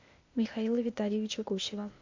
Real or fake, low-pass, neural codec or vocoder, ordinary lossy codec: fake; 7.2 kHz; codec, 16 kHz, 0.8 kbps, ZipCodec; MP3, 48 kbps